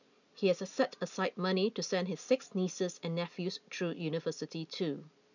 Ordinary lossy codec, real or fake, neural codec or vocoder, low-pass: none; real; none; 7.2 kHz